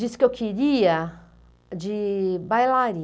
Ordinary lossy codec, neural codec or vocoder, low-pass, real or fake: none; none; none; real